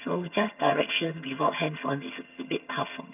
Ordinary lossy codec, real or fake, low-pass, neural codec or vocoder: none; fake; 3.6 kHz; vocoder, 22.05 kHz, 80 mel bands, HiFi-GAN